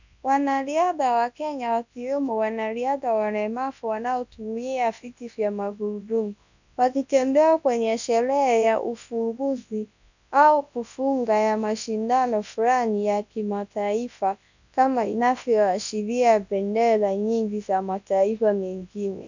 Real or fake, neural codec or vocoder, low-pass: fake; codec, 24 kHz, 0.9 kbps, WavTokenizer, large speech release; 7.2 kHz